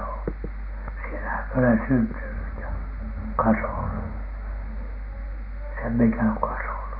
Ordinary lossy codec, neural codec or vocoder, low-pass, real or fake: none; none; 5.4 kHz; real